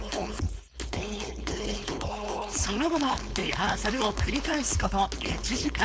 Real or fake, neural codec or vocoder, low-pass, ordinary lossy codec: fake; codec, 16 kHz, 4.8 kbps, FACodec; none; none